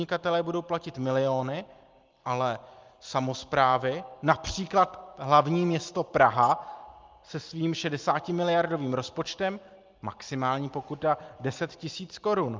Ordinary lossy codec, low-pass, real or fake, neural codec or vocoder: Opus, 32 kbps; 7.2 kHz; real; none